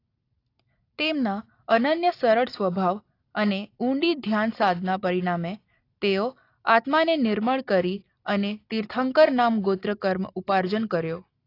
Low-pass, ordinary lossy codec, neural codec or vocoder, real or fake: 5.4 kHz; AAC, 32 kbps; none; real